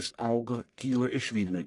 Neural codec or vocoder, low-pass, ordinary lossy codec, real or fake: codec, 44.1 kHz, 1.7 kbps, Pupu-Codec; 10.8 kHz; AAC, 48 kbps; fake